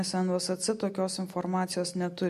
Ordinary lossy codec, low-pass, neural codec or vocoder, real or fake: MP3, 64 kbps; 14.4 kHz; none; real